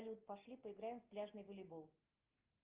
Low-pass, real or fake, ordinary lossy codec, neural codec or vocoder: 3.6 kHz; real; Opus, 32 kbps; none